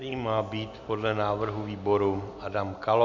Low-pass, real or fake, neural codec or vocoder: 7.2 kHz; real; none